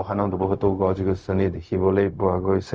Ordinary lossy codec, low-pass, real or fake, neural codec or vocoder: none; none; fake; codec, 16 kHz, 0.4 kbps, LongCat-Audio-Codec